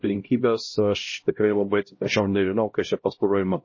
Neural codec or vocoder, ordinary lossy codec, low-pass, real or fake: codec, 16 kHz, 0.5 kbps, X-Codec, HuBERT features, trained on LibriSpeech; MP3, 32 kbps; 7.2 kHz; fake